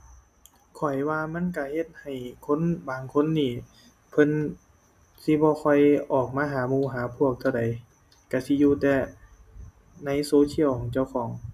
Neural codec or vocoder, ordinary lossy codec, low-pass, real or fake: none; none; 14.4 kHz; real